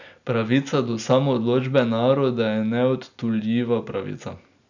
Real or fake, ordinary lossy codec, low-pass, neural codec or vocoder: real; none; 7.2 kHz; none